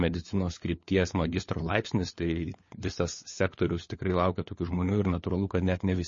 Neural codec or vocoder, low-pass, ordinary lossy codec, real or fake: codec, 16 kHz, 4 kbps, FunCodec, trained on LibriTTS, 50 frames a second; 7.2 kHz; MP3, 32 kbps; fake